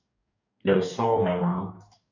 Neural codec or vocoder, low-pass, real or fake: codec, 44.1 kHz, 2.6 kbps, DAC; 7.2 kHz; fake